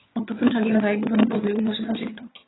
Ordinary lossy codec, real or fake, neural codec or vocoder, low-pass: AAC, 16 kbps; fake; vocoder, 22.05 kHz, 80 mel bands, HiFi-GAN; 7.2 kHz